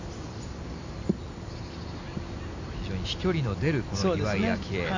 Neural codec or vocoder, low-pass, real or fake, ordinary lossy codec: none; 7.2 kHz; real; AAC, 48 kbps